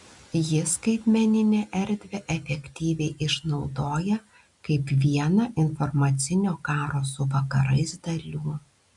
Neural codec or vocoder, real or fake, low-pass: none; real; 10.8 kHz